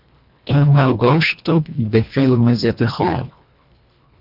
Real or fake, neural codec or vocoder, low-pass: fake; codec, 24 kHz, 1.5 kbps, HILCodec; 5.4 kHz